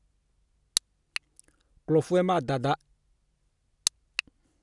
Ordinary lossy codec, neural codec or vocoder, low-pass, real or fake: none; none; 10.8 kHz; real